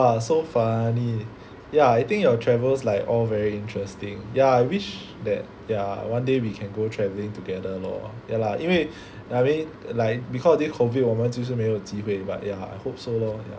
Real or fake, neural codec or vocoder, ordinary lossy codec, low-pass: real; none; none; none